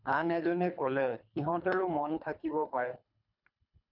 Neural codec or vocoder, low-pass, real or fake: codec, 24 kHz, 3 kbps, HILCodec; 5.4 kHz; fake